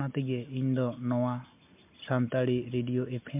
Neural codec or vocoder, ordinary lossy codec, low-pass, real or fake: none; MP3, 32 kbps; 3.6 kHz; real